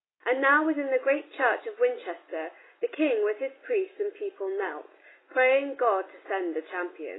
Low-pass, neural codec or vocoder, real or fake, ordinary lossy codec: 7.2 kHz; none; real; AAC, 16 kbps